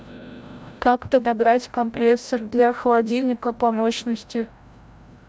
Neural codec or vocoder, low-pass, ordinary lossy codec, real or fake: codec, 16 kHz, 0.5 kbps, FreqCodec, larger model; none; none; fake